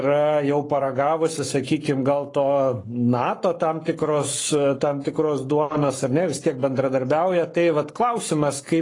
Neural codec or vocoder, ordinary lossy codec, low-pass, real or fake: codec, 44.1 kHz, 7.8 kbps, Pupu-Codec; AAC, 32 kbps; 10.8 kHz; fake